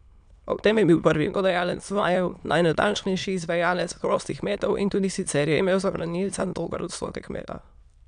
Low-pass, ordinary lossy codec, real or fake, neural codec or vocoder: 9.9 kHz; none; fake; autoencoder, 22.05 kHz, a latent of 192 numbers a frame, VITS, trained on many speakers